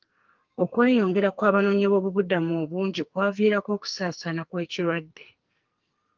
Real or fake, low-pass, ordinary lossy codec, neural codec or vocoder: fake; 7.2 kHz; Opus, 24 kbps; codec, 44.1 kHz, 2.6 kbps, SNAC